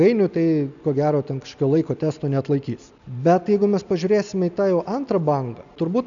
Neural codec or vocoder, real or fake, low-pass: none; real; 7.2 kHz